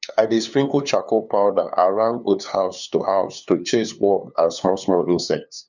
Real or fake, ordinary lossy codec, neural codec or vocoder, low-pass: fake; none; codec, 16 kHz, 2 kbps, FunCodec, trained on LibriTTS, 25 frames a second; 7.2 kHz